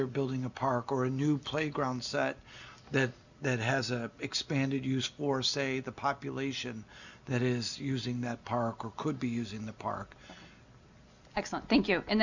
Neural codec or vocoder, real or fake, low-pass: none; real; 7.2 kHz